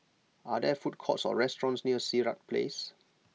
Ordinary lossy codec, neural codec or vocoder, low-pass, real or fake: none; none; none; real